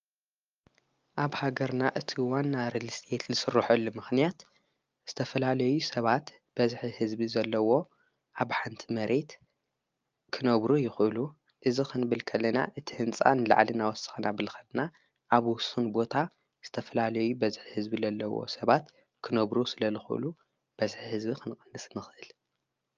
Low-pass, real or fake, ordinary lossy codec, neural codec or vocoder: 7.2 kHz; real; Opus, 24 kbps; none